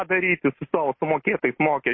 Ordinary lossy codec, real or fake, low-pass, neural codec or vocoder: MP3, 24 kbps; real; 7.2 kHz; none